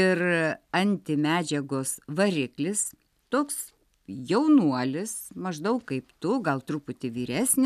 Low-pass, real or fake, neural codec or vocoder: 14.4 kHz; real; none